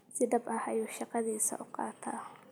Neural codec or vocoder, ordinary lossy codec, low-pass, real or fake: none; none; none; real